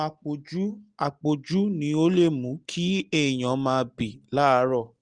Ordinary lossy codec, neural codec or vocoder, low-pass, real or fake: Opus, 24 kbps; none; 9.9 kHz; real